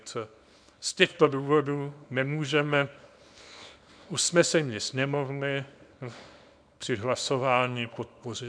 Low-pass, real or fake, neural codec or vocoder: 9.9 kHz; fake; codec, 24 kHz, 0.9 kbps, WavTokenizer, small release